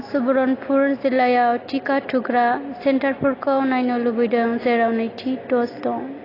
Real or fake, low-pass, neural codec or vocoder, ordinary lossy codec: real; 5.4 kHz; none; AAC, 24 kbps